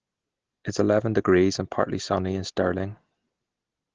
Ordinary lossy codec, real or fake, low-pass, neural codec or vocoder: Opus, 16 kbps; real; 7.2 kHz; none